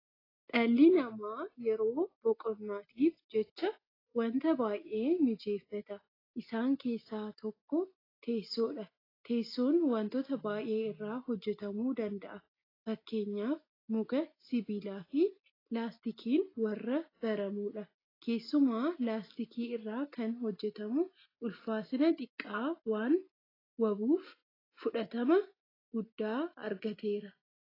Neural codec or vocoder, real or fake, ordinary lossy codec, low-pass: none; real; AAC, 24 kbps; 5.4 kHz